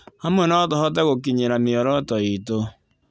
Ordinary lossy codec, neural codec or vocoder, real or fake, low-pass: none; none; real; none